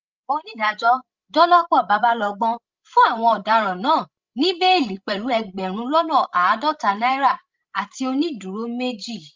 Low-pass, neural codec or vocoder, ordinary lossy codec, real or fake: 7.2 kHz; codec, 16 kHz, 16 kbps, FreqCodec, larger model; Opus, 32 kbps; fake